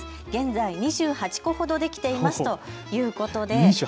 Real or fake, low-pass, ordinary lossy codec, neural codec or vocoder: real; none; none; none